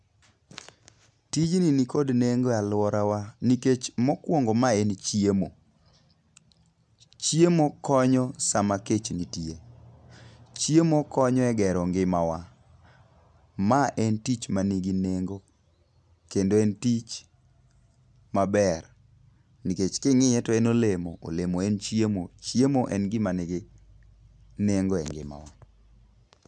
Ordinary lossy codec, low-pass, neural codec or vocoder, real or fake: none; none; none; real